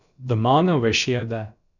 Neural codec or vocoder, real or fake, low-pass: codec, 16 kHz, about 1 kbps, DyCAST, with the encoder's durations; fake; 7.2 kHz